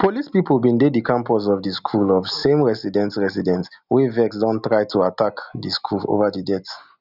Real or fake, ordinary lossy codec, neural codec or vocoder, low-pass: real; none; none; 5.4 kHz